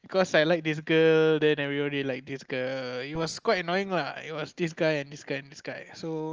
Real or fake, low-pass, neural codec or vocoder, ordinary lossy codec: real; 7.2 kHz; none; Opus, 24 kbps